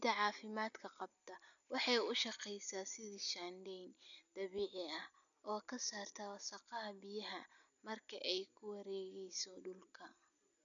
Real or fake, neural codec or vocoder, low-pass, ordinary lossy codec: real; none; 7.2 kHz; none